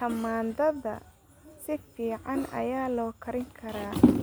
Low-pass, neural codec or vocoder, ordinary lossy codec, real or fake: none; none; none; real